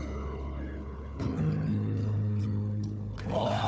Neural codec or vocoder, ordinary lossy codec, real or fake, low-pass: codec, 16 kHz, 4 kbps, FunCodec, trained on Chinese and English, 50 frames a second; none; fake; none